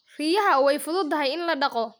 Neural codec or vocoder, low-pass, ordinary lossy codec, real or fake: none; none; none; real